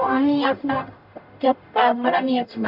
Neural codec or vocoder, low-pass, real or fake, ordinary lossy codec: codec, 44.1 kHz, 0.9 kbps, DAC; 5.4 kHz; fake; none